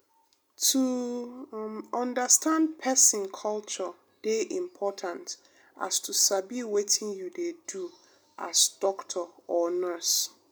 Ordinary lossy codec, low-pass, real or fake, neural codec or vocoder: none; none; real; none